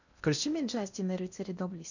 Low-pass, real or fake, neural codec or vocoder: 7.2 kHz; fake; codec, 16 kHz in and 24 kHz out, 0.6 kbps, FocalCodec, streaming, 2048 codes